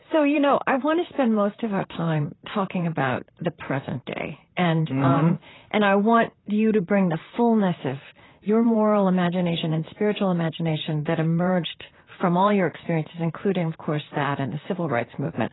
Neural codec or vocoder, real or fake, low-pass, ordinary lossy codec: vocoder, 44.1 kHz, 128 mel bands, Pupu-Vocoder; fake; 7.2 kHz; AAC, 16 kbps